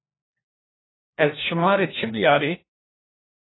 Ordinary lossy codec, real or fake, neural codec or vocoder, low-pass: AAC, 16 kbps; fake; codec, 16 kHz, 1 kbps, FunCodec, trained on LibriTTS, 50 frames a second; 7.2 kHz